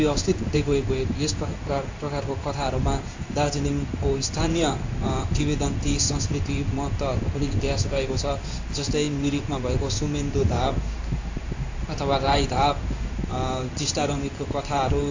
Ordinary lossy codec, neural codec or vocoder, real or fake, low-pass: AAC, 48 kbps; codec, 16 kHz in and 24 kHz out, 1 kbps, XY-Tokenizer; fake; 7.2 kHz